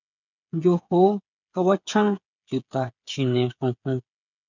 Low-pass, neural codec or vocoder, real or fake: 7.2 kHz; codec, 16 kHz, 8 kbps, FreqCodec, smaller model; fake